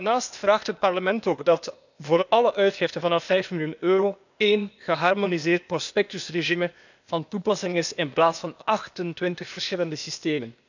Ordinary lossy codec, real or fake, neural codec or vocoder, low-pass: none; fake; codec, 16 kHz, 0.8 kbps, ZipCodec; 7.2 kHz